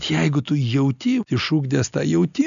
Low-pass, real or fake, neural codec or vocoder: 7.2 kHz; real; none